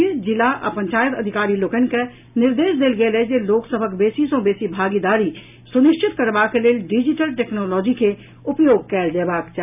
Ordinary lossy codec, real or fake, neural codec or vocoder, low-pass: none; real; none; 3.6 kHz